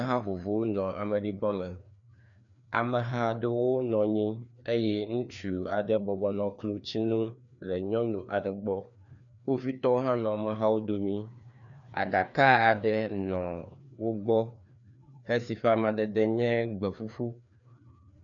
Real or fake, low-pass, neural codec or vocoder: fake; 7.2 kHz; codec, 16 kHz, 2 kbps, FreqCodec, larger model